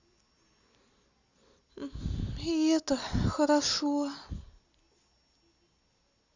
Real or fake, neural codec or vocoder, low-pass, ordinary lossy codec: real; none; 7.2 kHz; Opus, 64 kbps